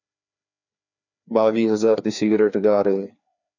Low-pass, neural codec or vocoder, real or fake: 7.2 kHz; codec, 16 kHz, 2 kbps, FreqCodec, larger model; fake